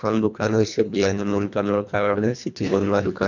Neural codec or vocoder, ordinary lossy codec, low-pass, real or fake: codec, 24 kHz, 1.5 kbps, HILCodec; none; 7.2 kHz; fake